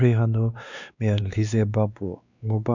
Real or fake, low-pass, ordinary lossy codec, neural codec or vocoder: fake; 7.2 kHz; none; codec, 16 kHz, 2 kbps, X-Codec, WavLM features, trained on Multilingual LibriSpeech